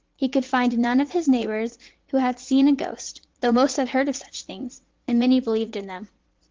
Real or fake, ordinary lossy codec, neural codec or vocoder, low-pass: fake; Opus, 16 kbps; vocoder, 44.1 kHz, 80 mel bands, Vocos; 7.2 kHz